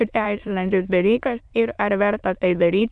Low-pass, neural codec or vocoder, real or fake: 9.9 kHz; autoencoder, 22.05 kHz, a latent of 192 numbers a frame, VITS, trained on many speakers; fake